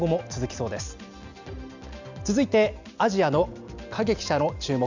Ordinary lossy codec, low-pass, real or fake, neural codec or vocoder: Opus, 64 kbps; 7.2 kHz; real; none